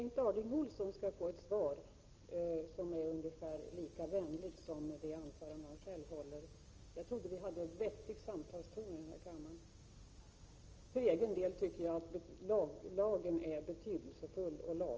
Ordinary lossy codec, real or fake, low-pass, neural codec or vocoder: Opus, 24 kbps; real; 7.2 kHz; none